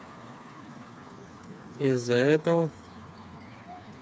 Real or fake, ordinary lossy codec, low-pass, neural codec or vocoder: fake; none; none; codec, 16 kHz, 4 kbps, FreqCodec, smaller model